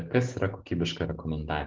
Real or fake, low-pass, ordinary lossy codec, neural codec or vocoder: real; 7.2 kHz; Opus, 16 kbps; none